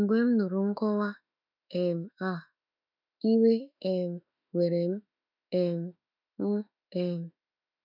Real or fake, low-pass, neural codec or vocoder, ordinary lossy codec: fake; 5.4 kHz; autoencoder, 48 kHz, 32 numbers a frame, DAC-VAE, trained on Japanese speech; none